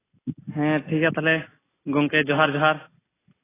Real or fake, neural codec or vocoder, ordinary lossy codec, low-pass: real; none; AAC, 16 kbps; 3.6 kHz